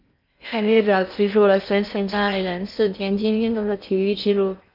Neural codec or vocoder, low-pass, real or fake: codec, 16 kHz in and 24 kHz out, 0.6 kbps, FocalCodec, streaming, 2048 codes; 5.4 kHz; fake